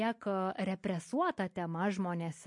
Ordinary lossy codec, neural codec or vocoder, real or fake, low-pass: MP3, 48 kbps; none; real; 10.8 kHz